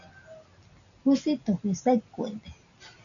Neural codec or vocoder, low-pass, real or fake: none; 7.2 kHz; real